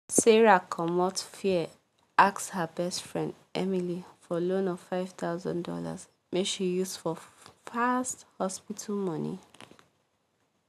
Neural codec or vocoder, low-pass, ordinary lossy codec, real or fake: none; 14.4 kHz; none; real